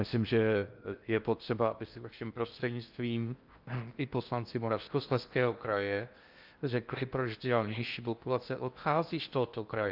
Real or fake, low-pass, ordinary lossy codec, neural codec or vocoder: fake; 5.4 kHz; Opus, 24 kbps; codec, 16 kHz in and 24 kHz out, 0.6 kbps, FocalCodec, streaming, 4096 codes